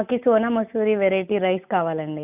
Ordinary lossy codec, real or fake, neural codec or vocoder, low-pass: none; real; none; 3.6 kHz